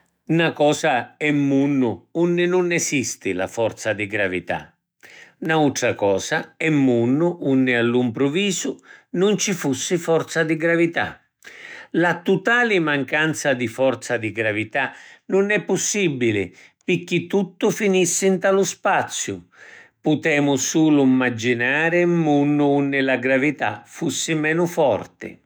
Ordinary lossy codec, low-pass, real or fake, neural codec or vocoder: none; none; fake; autoencoder, 48 kHz, 128 numbers a frame, DAC-VAE, trained on Japanese speech